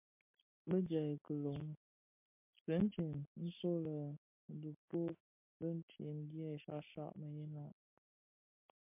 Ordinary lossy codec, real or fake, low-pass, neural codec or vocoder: MP3, 32 kbps; real; 3.6 kHz; none